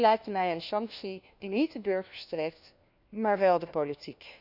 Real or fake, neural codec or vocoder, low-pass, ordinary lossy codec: fake; codec, 16 kHz, 1 kbps, FunCodec, trained on LibriTTS, 50 frames a second; 5.4 kHz; none